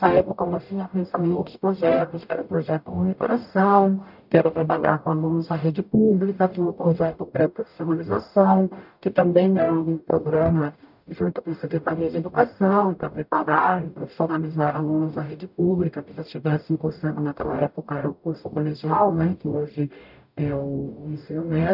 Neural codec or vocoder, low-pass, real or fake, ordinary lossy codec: codec, 44.1 kHz, 0.9 kbps, DAC; 5.4 kHz; fake; none